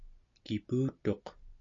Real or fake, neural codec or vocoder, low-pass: real; none; 7.2 kHz